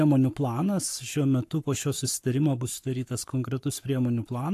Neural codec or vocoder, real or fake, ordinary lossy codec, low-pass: codec, 44.1 kHz, 7.8 kbps, Pupu-Codec; fake; AAC, 64 kbps; 14.4 kHz